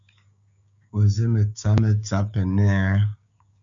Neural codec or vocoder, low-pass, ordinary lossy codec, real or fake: codec, 16 kHz, 6 kbps, DAC; 7.2 kHz; Opus, 64 kbps; fake